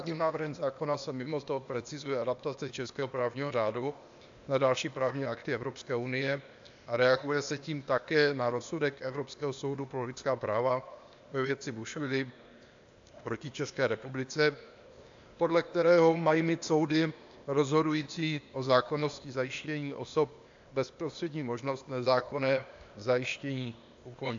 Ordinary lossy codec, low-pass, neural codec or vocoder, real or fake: AAC, 64 kbps; 7.2 kHz; codec, 16 kHz, 0.8 kbps, ZipCodec; fake